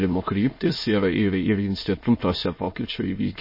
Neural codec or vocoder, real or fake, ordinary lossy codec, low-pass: autoencoder, 22.05 kHz, a latent of 192 numbers a frame, VITS, trained on many speakers; fake; MP3, 24 kbps; 5.4 kHz